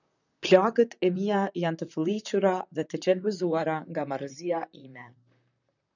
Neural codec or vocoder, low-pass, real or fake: vocoder, 44.1 kHz, 128 mel bands, Pupu-Vocoder; 7.2 kHz; fake